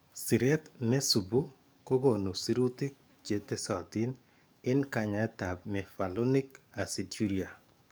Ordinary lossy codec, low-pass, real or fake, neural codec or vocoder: none; none; fake; codec, 44.1 kHz, 7.8 kbps, DAC